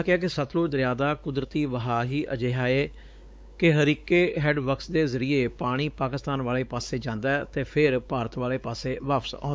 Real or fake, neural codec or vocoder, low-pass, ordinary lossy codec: fake; codec, 16 kHz, 4 kbps, X-Codec, WavLM features, trained on Multilingual LibriSpeech; none; none